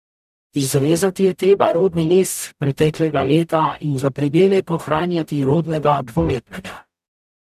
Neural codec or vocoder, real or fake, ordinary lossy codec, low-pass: codec, 44.1 kHz, 0.9 kbps, DAC; fake; none; 14.4 kHz